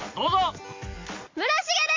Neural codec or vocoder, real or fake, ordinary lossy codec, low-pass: none; real; MP3, 48 kbps; 7.2 kHz